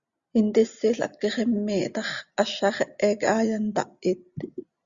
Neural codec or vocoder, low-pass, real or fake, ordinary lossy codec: none; 7.2 kHz; real; Opus, 64 kbps